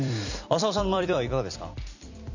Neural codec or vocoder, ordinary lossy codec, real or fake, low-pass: vocoder, 44.1 kHz, 128 mel bands every 256 samples, BigVGAN v2; none; fake; 7.2 kHz